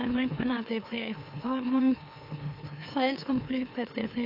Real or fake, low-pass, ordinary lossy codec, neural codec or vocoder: fake; 5.4 kHz; AAC, 32 kbps; autoencoder, 44.1 kHz, a latent of 192 numbers a frame, MeloTTS